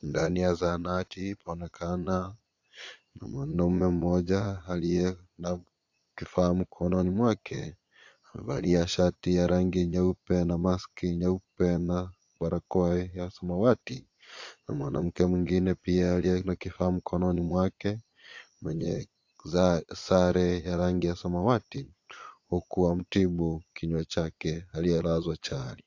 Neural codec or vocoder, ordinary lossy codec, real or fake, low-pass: vocoder, 22.05 kHz, 80 mel bands, Vocos; AAC, 48 kbps; fake; 7.2 kHz